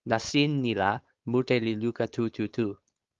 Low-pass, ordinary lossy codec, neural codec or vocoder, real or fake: 7.2 kHz; Opus, 32 kbps; codec, 16 kHz, 4.8 kbps, FACodec; fake